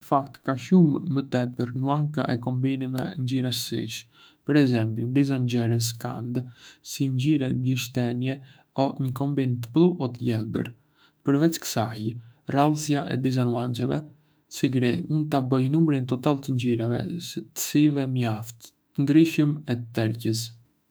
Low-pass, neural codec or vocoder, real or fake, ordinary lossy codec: none; autoencoder, 48 kHz, 32 numbers a frame, DAC-VAE, trained on Japanese speech; fake; none